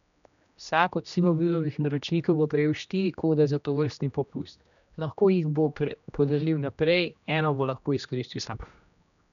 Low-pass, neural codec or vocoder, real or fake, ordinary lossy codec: 7.2 kHz; codec, 16 kHz, 1 kbps, X-Codec, HuBERT features, trained on general audio; fake; none